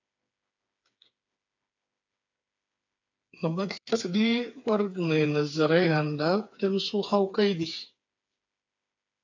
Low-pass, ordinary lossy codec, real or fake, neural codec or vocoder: 7.2 kHz; AAC, 48 kbps; fake; codec, 16 kHz, 4 kbps, FreqCodec, smaller model